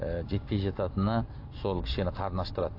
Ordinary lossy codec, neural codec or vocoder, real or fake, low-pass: AAC, 32 kbps; none; real; 5.4 kHz